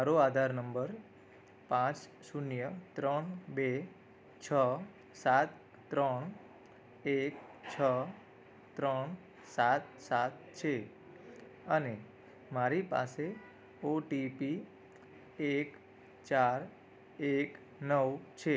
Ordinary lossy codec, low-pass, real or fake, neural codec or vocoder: none; none; real; none